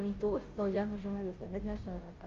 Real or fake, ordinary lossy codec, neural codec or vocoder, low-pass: fake; Opus, 24 kbps; codec, 16 kHz, 0.5 kbps, FunCodec, trained on Chinese and English, 25 frames a second; 7.2 kHz